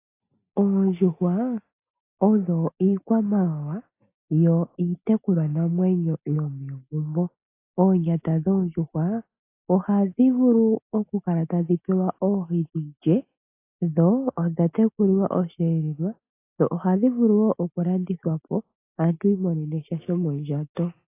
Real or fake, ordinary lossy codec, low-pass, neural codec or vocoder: real; AAC, 24 kbps; 3.6 kHz; none